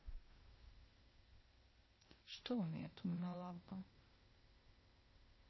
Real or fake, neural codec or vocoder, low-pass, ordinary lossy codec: fake; codec, 16 kHz, 0.8 kbps, ZipCodec; 7.2 kHz; MP3, 24 kbps